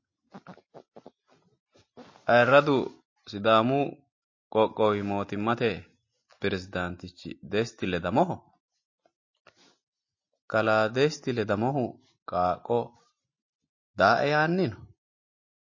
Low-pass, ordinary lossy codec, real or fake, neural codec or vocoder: 7.2 kHz; MP3, 32 kbps; real; none